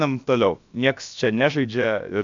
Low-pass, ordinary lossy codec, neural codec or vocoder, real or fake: 7.2 kHz; AAC, 64 kbps; codec, 16 kHz, about 1 kbps, DyCAST, with the encoder's durations; fake